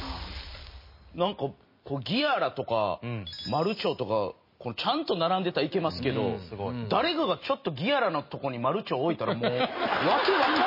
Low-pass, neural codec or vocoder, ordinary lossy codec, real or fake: 5.4 kHz; none; MP3, 24 kbps; real